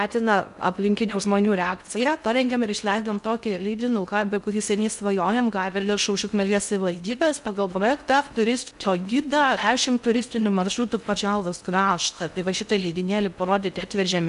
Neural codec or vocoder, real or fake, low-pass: codec, 16 kHz in and 24 kHz out, 0.6 kbps, FocalCodec, streaming, 2048 codes; fake; 10.8 kHz